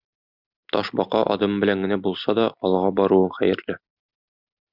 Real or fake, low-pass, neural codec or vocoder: real; 5.4 kHz; none